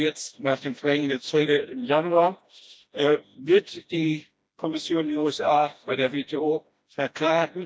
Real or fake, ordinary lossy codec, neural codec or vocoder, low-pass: fake; none; codec, 16 kHz, 1 kbps, FreqCodec, smaller model; none